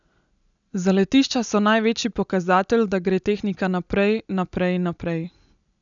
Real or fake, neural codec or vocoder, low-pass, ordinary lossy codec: real; none; 7.2 kHz; none